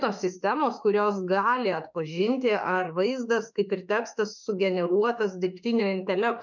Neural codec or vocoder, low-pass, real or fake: autoencoder, 48 kHz, 32 numbers a frame, DAC-VAE, trained on Japanese speech; 7.2 kHz; fake